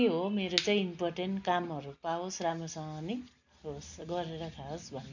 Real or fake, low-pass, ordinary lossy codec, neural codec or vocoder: real; 7.2 kHz; none; none